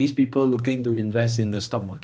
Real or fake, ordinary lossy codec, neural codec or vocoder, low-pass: fake; none; codec, 16 kHz, 1 kbps, X-Codec, HuBERT features, trained on balanced general audio; none